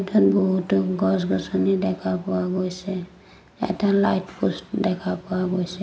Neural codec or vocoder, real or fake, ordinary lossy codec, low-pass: none; real; none; none